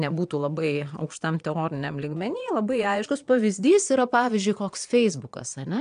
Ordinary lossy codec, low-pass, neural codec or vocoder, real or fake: MP3, 96 kbps; 9.9 kHz; vocoder, 22.05 kHz, 80 mel bands, Vocos; fake